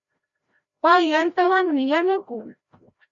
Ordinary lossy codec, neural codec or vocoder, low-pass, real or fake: Opus, 64 kbps; codec, 16 kHz, 0.5 kbps, FreqCodec, larger model; 7.2 kHz; fake